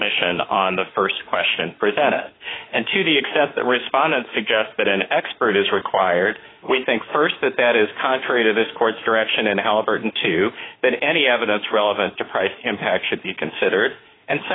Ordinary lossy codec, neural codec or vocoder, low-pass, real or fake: AAC, 16 kbps; autoencoder, 48 kHz, 32 numbers a frame, DAC-VAE, trained on Japanese speech; 7.2 kHz; fake